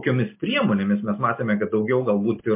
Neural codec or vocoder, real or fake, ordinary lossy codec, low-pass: none; real; MP3, 24 kbps; 3.6 kHz